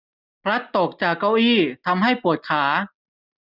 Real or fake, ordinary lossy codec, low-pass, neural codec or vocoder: real; none; 5.4 kHz; none